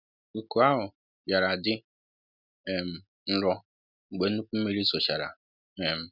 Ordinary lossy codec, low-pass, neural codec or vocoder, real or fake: none; 5.4 kHz; none; real